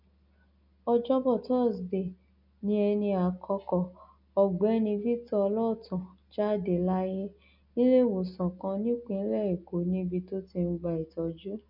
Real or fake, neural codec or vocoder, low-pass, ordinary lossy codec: real; none; 5.4 kHz; none